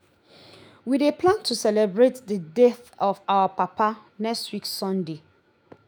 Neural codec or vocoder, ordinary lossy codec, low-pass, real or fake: autoencoder, 48 kHz, 128 numbers a frame, DAC-VAE, trained on Japanese speech; none; none; fake